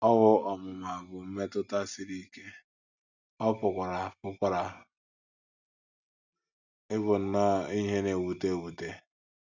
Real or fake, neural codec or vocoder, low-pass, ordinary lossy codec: real; none; 7.2 kHz; none